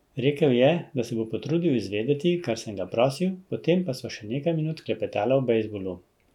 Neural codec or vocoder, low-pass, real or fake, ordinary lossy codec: none; 19.8 kHz; real; none